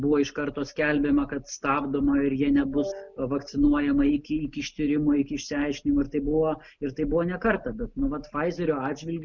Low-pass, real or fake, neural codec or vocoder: 7.2 kHz; real; none